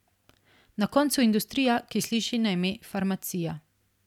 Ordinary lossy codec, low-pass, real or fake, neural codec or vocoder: none; 19.8 kHz; real; none